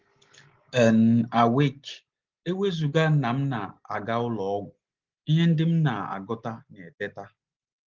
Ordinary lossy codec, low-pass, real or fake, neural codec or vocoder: Opus, 16 kbps; 7.2 kHz; real; none